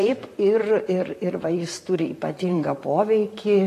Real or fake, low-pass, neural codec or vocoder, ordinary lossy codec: fake; 14.4 kHz; vocoder, 44.1 kHz, 128 mel bands, Pupu-Vocoder; AAC, 48 kbps